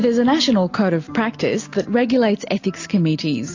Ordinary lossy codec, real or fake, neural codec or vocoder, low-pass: AAC, 48 kbps; real; none; 7.2 kHz